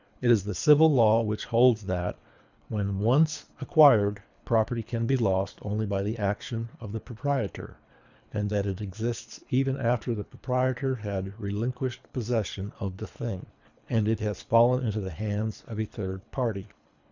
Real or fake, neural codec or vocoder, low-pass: fake; codec, 24 kHz, 3 kbps, HILCodec; 7.2 kHz